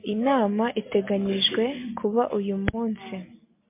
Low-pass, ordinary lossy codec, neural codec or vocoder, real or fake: 3.6 kHz; MP3, 24 kbps; none; real